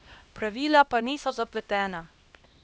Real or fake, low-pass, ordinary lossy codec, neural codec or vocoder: fake; none; none; codec, 16 kHz, 1 kbps, X-Codec, HuBERT features, trained on LibriSpeech